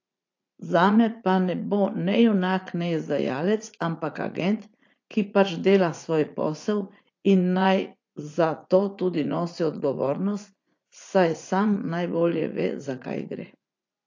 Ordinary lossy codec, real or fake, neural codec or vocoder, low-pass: AAC, 48 kbps; fake; vocoder, 44.1 kHz, 80 mel bands, Vocos; 7.2 kHz